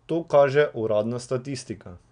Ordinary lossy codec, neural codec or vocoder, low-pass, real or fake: none; none; 9.9 kHz; real